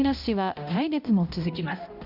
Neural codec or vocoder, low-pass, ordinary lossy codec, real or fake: codec, 16 kHz, 0.5 kbps, X-Codec, HuBERT features, trained on balanced general audio; 5.4 kHz; none; fake